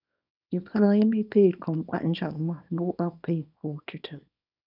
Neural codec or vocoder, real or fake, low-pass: codec, 24 kHz, 0.9 kbps, WavTokenizer, small release; fake; 5.4 kHz